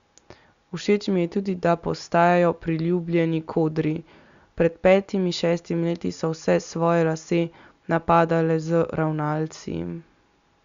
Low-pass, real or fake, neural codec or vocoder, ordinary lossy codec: 7.2 kHz; real; none; Opus, 64 kbps